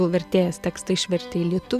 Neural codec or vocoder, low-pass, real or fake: none; 14.4 kHz; real